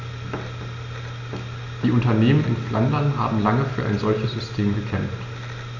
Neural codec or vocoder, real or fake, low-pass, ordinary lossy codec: none; real; 7.2 kHz; none